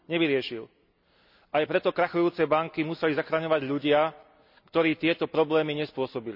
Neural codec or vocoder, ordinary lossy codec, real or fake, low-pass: none; none; real; 5.4 kHz